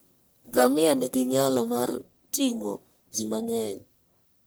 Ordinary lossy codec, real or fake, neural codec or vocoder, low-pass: none; fake; codec, 44.1 kHz, 1.7 kbps, Pupu-Codec; none